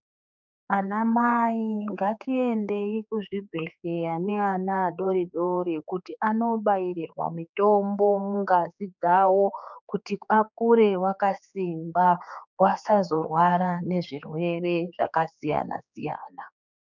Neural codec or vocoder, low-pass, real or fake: codec, 16 kHz, 4 kbps, X-Codec, HuBERT features, trained on general audio; 7.2 kHz; fake